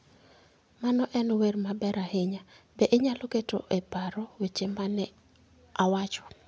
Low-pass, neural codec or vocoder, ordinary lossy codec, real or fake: none; none; none; real